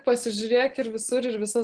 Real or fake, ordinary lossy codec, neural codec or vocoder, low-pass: real; Opus, 16 kbps; none; 9.9 kHz